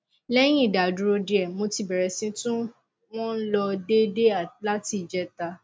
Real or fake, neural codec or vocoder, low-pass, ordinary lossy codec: real; none; none; none